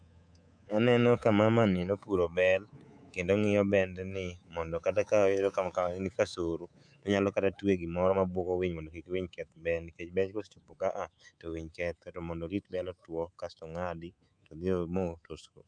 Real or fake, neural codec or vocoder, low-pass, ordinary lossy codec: fake; codec, 24 kHz, 3.1 kbps, DualCodec; 9.9 kHz; none